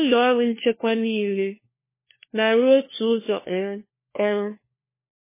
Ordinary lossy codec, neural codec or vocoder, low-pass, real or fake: MP3, 16 kbps; codec, 16 kHz, 1 kbps, FunCodec, trained on LibriTTS, 50 frames a second; 3.6 kHz; fake